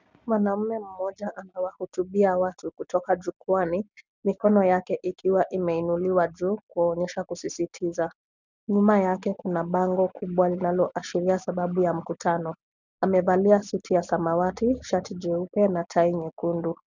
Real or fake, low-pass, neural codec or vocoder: real; 7.2 kHz; none